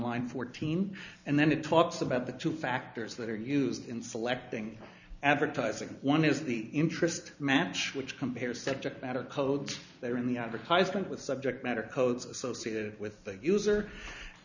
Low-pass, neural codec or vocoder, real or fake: 7.2 kHz; none; real